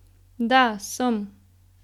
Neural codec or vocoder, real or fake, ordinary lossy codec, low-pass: none; real; none; 19.8 kHz